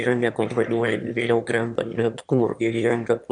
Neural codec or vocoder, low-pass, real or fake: autoencoder, 22.05 kHz, a latent of 192 numbers a frame, VITS, trained on one speaker; 9.9 kHz; fake